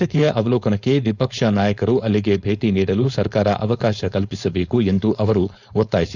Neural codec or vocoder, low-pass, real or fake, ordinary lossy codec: codec, 16 kHz, 4.8 kbps, FACodec; 7.2 kHz; fake; none